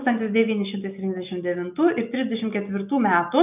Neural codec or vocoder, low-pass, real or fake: none; 3.6 kHz; real